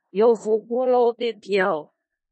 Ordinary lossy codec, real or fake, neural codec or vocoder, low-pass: MP3, 32 kbps; fake; codec, 16 kHz in and 24 kHz out, 0.4 kbps, LongCat-Audio-Codec, four codebook decoder; 10.8 kHz